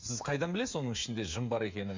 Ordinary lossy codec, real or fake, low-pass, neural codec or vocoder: MP3, 64 kbps; fake; 7.2 kHz; codec, 16 kHz, 8 kbps, FreqCodec, smaller model